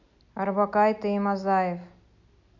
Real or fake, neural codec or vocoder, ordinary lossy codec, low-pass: real; none; MP3, 48 kbps; 7.2 kHz